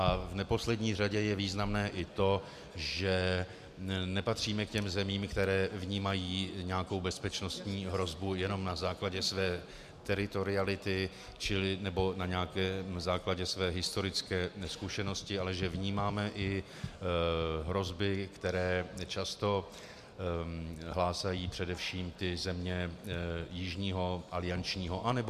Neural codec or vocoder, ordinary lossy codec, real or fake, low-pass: none; MP3, 96 kbps; real; 14.4 kHz